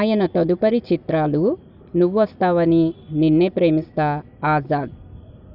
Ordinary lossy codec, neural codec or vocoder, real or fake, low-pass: none; none; real; 5.4 kHz